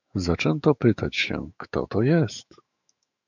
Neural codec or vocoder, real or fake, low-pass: autoencoder, 48 kHz, 128 numbers a frame, DAC-VAE, trained on Japanese speech; fake; 7.2 kHz